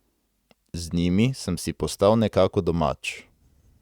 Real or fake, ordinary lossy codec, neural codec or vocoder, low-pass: real; Opus, 64 kbps; none; 19.8 kHz